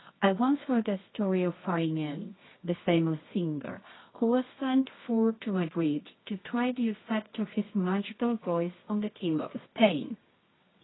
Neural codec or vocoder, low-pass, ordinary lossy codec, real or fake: codec, 24 kHz, 0.9 kbps, WavTokenizer, medium music audio release; 7.2 kHz; AAC, 16 kbps; fake